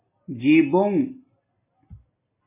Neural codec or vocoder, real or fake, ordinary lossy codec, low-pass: none; real; MP3, 16 kbps; 3.6 kHz